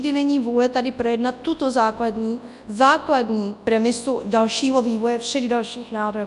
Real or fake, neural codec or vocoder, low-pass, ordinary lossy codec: fake; codec, 24 kHz, 0.9 kbps, WavTokenizer, large speech release; 10.8 kHz; AAC, 96 kbps